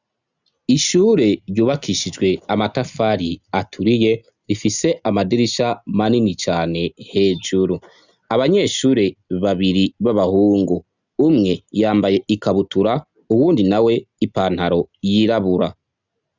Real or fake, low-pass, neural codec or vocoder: real; 7.2 kHz; none